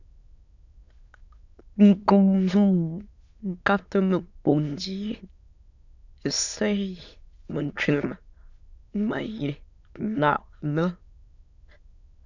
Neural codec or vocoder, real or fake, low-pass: autoencoder, 22.05 kHz, a latent of 192 numbers a frame, VITS, trained on many speakers; fake; 7.2 kHz